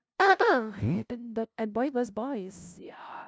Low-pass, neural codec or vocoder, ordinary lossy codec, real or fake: none; codec, 16 kHz, 0.5 kbps, FunCodec, trained on LibriTTS, 25 frames a second; none; fake